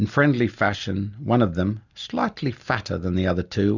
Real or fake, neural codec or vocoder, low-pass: real; none; 7.2 kHz